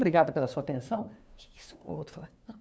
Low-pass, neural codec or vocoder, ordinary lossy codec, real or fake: none; codec, 16 kHz, 2 kbps, FunCodec, trained on LibriTTS, 25 frames a second; none; fake